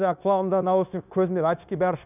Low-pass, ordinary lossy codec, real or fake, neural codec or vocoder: 3.6 kHz; none; fake; codec, 16 kHz, 0.9 kbps, LongCat-Audio-Codec